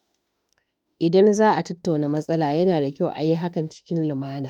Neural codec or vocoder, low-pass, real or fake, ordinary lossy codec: autoencoder, 48 kHz, 32 numbers a frame, DAC-VAE, trained on Japanese speech; 19.8 kHz; fake; none